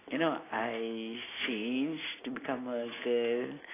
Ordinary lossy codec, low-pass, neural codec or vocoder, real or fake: AAC, 16 kbps; 3.6 kHz; none; real